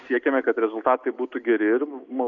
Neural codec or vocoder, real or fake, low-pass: none; real; 7.2 kHz